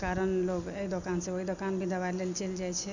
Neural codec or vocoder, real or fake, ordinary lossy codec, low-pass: none; real; none; 7.2 kHz